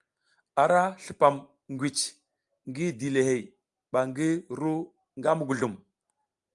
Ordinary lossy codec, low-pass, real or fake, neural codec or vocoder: Opus, 32 kbps; 10.8 kHz; real; none